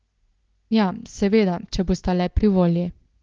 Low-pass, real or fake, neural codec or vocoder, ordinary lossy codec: 7.2 kHz; real; none; Opus, 16 kbps